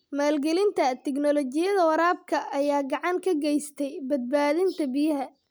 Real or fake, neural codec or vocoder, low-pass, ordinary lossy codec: real; none; none; none